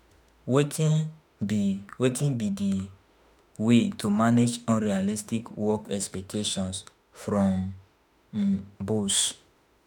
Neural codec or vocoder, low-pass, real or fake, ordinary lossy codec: autoencoder, 48 kHz, 32 numbers a frame, DAC-VAE, trained on Japanese speech; none; fake; none